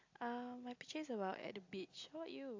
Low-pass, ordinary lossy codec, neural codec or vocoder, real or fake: 7.2 kHz; AAC, 48 kbps; none; real